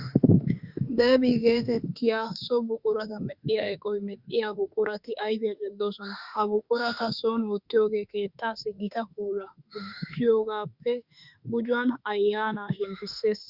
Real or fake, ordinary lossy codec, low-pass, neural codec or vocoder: fake; Opus, 64 kbps; 5.4 kHz; autoencoder, 48 kHz, 32 numbers a frame, DAC-VAE, trained on Japanese speech